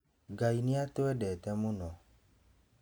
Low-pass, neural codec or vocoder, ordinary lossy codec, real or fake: none; none; none; real